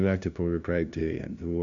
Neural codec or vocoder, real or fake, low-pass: codec, 16 kHz, 0.5 kbps, FunCodec, trained on LibriTTS, 25 frames a second; fake; 7.2 kHz